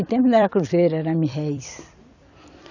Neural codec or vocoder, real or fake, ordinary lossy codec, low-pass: vocoder, 44.1 kHz, 128 mel bands every 256 samples, BigVGAN v2; fake; none; 7.2 kHz